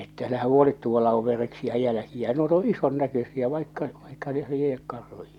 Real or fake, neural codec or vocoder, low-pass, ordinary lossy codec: real; none; 19.8 kHz; none